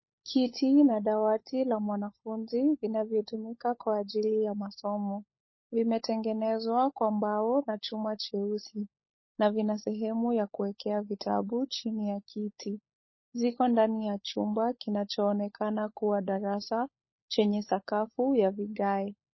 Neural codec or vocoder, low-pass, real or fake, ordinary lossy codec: codec, 16 kHz, 16 kbps, FunCodec, trained on LibriTTS, 50 frames a second; 7.2 kHz; fake; MP3, 24 kbps